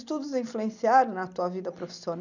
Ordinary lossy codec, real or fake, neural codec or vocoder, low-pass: none; real; none; 7.2 kHz